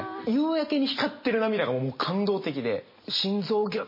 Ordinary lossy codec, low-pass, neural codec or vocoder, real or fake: MP3, 24 kbps; 5.4 kHz; none; real